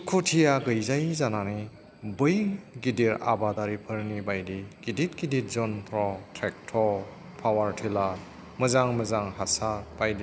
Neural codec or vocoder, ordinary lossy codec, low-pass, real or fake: none; none; none; real